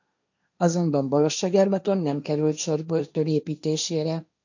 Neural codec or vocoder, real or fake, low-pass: codec, 16 kHz, 1.1 kbps, Voila-Tokenizer; fake; 7.2 kHz